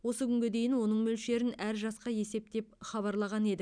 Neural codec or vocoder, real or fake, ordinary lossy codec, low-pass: none; real; none; 9.9 kHz